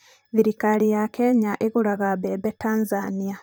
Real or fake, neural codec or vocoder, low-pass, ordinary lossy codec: fake; vocoder, 44.1 kHz, 128 mel bands, Pupu-Vocoder; none; none